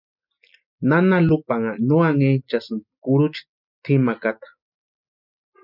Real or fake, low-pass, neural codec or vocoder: real; 5.4 kHz; none